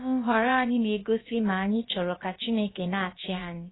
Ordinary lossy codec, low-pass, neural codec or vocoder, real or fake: AAC, 16 kbps; 7.2 kHz; codec, 16 kHz, about 1 kbps, DyCAST, with the encoder's durations; fake